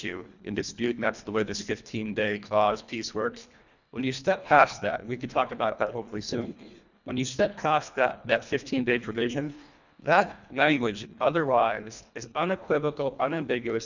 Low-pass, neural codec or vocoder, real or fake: 7.2 kHz; codec, 24 kHz, 1.5 kbps, HILCodec; fake